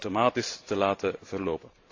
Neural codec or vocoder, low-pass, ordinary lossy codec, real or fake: vocoder, 44.1 kHz, 128 mel bands every 512 samples, BigVGAN v2; 7.2 kHz; AAC, 48 kbps; fake